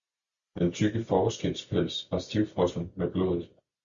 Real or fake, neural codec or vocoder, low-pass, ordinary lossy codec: real; none; 7.2 kHz; Opus, 64 kbps